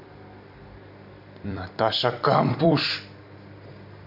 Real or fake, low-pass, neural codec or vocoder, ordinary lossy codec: fake; 5.4 kHz; vocoder, 44.1 kHz, 128 mel bands every 256 samples, BigVGAN v2; none